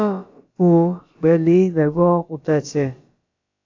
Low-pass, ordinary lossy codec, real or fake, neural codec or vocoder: 7.2 kHz; AAC, 48 kbps; fake; codec, 16 kHz, about 1 kbps, DyCAST, with the encoder's durations